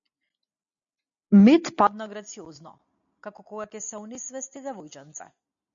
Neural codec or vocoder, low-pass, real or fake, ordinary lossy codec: none; 7.2 kHz; real; MP3, 64 kbps